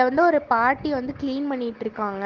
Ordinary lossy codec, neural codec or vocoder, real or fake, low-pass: Opus, 16 kbps; none; real; 7.2 kHz